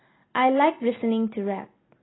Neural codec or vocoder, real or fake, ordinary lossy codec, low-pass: none; real; AAC, 16 kbps; 7.2 kHz